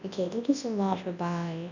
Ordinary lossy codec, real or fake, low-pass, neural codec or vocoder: none; fake; 7.2 kHz; codec, 24 kHz, 0.9 kbps, WavTokenizer, large speech release